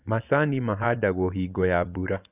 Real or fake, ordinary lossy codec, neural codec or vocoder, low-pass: fake; none; vocoder, 24 kHz, 100 mel bands, Vocos; 3.6 kHz